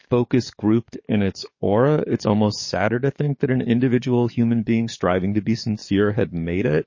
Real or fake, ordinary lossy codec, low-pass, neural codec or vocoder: fake; MP3, 32 kbps; 7.2 kHz; codec, 44.1 kHz, 7.8 kbps, DAC